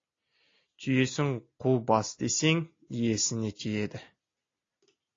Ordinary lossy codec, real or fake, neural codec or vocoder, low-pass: AAC, 32 kbps; real; none; 7.2 kHz